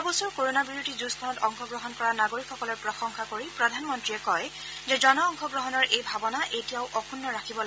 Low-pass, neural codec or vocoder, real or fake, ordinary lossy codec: none; none; real; none